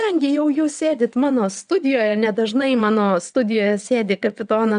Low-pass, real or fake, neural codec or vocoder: 9.9 kHz; fake; vocoder, 22.05 kHz, 80 mel bands, WaveNeXt